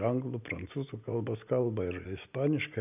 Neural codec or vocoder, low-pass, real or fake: none; 3.6 kHz; real